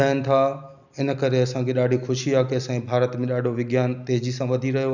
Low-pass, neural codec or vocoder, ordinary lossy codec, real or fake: 7.2 kHz; none; none; real